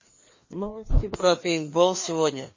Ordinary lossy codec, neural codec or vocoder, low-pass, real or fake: MP3, 32 kbps; codec, 16 kHz, 2 kbps, FreqCodec, larger model; 7.2 kHz; fake